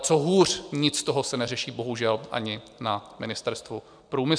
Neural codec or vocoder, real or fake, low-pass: none; real; 9.9 kHz